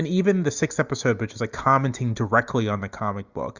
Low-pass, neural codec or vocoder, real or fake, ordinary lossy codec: 7.2 kHz; none; real; Opus, 64 kbps